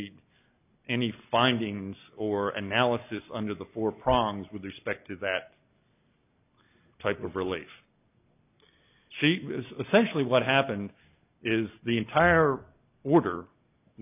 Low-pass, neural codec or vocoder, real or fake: 3.6 kHz; none; real